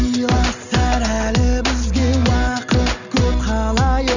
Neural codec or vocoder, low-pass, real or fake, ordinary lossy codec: none; 7.2 kHz; real; none